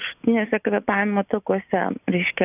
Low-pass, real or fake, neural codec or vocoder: 3.6 kHz; real; none